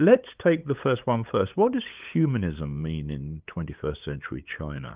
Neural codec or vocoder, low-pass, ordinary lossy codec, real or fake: codec, 16 kHz, 8 kbps, FunCodec, trained on LibriTTS, 25 frames a second; 3.6 kHz; Opus, 32 kbps; fake